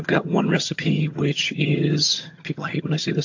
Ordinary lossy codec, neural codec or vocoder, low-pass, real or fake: AAC, 48 kbps; vocoder, 22.05 kHz, 80 mel bands, HiFi-GAN; 7.2 kHz; fake